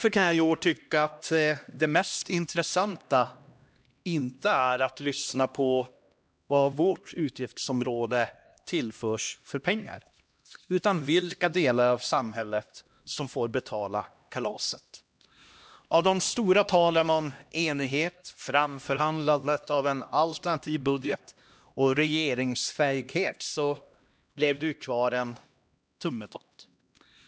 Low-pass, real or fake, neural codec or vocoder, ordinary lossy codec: none; fake; codec, 16 kHz, 1 kbps, X-Codec, HuBERT features, trained on LibriSpeech; none